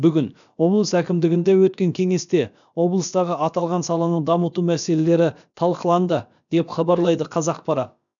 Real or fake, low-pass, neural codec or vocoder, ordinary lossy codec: fake; 7.2 kHz; codec, 16 kHz, about 1 kbps, DyCAST, with the encoder's durations; none